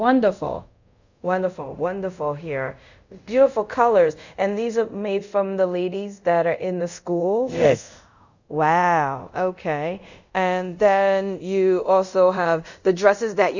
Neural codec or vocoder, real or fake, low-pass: codec, 24 kHz, 0.5 kbps, DualCodec; fake; 7.2 kHz